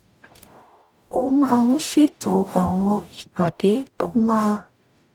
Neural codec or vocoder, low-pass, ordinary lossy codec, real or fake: codec, 44.1 kHz, 0.9 kbps, DAC; 19.8 kHz; none; fake